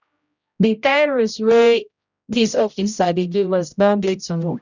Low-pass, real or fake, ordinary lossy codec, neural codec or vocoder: 7.2 kHz; fake; none; codec, 16 kHz, 0.5 kbps, X-Codec, HuBERT features, trained on general audio